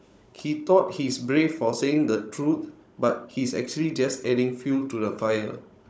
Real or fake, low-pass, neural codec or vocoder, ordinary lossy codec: fake; none; codec, 16 kHz, 16 kbps, FunCodec, trained on Chinese and English, 50 frames a second; none